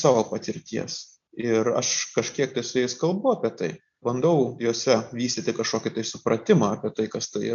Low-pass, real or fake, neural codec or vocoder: 7.2 kHz; real; none